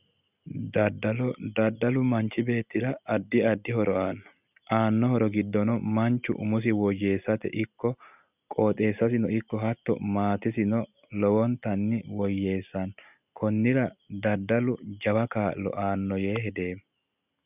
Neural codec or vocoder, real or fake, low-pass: none; real; 3.6 kHz